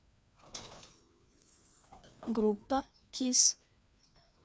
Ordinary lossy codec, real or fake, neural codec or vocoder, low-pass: none; fake; codec, 16 kHz, 2 kbps, FreqCodec, larger model; none